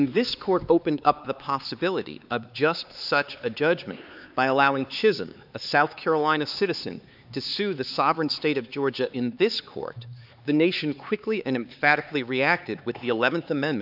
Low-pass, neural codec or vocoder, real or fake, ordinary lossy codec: 5.4 kHz; codec, 16 kHz, 4 kbps, X-Codec, HuBERT features, trained on LibriSpeech; fake; AAC, 48 kbps